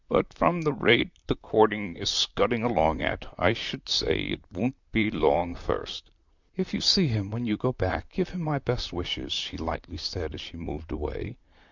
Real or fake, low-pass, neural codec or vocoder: fake; 7.2 kHz; vocoder, 44.1 kHz, 128 mel bands, Pupu-Vocoder